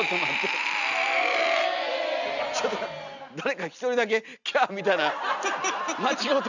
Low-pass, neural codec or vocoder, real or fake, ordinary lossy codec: 7.2 kHz; none; real; none